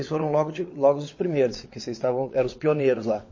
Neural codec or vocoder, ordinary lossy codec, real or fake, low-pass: vocoder, 22.05 kHz, 80 mel bands, WaveNeXt; MP3, 32 kbps; fake; 7.2 kHz